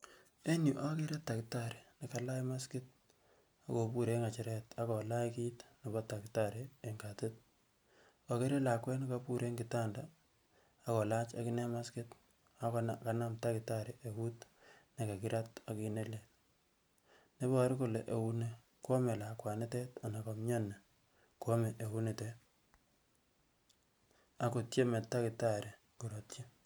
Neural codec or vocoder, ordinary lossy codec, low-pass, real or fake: none; none; none; real